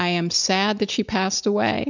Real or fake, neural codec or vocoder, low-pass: real; none; 7.2 kHz